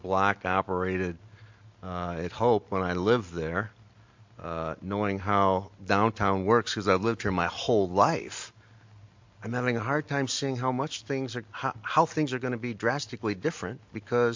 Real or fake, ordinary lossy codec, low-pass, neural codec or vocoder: real; MP3, 48 kbps; 7.2 kHz; none